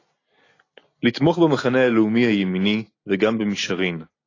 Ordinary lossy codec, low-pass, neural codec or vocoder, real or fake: AAC, 32 kbps; 7.2 kHz; none; real